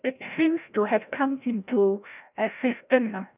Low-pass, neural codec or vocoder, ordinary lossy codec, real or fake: 3.6 kHz; codec, 16 kHz, 0.5 kbps, FreqCodec, larger model; none; fake